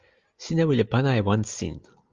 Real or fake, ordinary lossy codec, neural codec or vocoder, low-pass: real; Opus, 32 kbps; none; 7.2 kHz